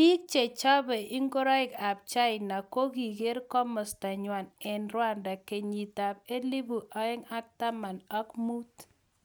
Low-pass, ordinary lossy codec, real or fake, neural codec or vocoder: none; none; real; none